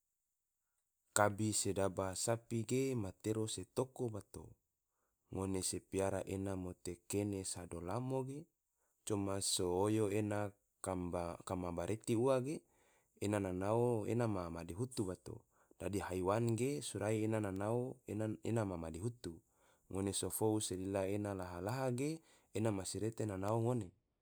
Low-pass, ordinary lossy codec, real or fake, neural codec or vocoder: none; none; real; none